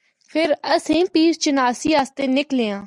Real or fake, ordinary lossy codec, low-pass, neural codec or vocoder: real; Opus, 64 kbps; 10.8 kHz; none